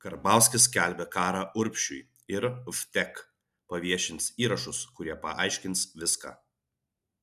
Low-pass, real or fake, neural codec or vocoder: 14.4 kHz; real; none